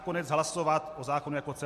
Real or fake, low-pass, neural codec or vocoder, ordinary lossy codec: real; 14.4 kHz; none; MP3, 64 kbps